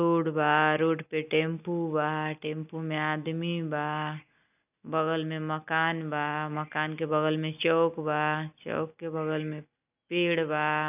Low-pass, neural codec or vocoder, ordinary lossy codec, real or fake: 3.6 kHz; none; none; real